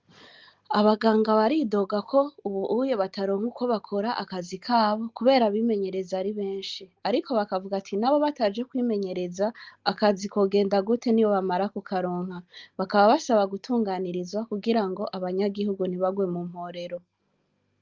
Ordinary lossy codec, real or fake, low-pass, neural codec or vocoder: Opus, 32 kbps; real; 7.2 kHz; none